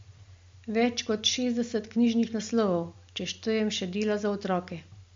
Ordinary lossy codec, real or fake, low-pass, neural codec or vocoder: MP3, 48 kbps; real; 7.2 kHz; none